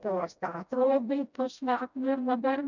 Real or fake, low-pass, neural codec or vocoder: fake; 7.2 kHz; codec, 16 kHz, 0.5 kbps, FreqCodec, smaller model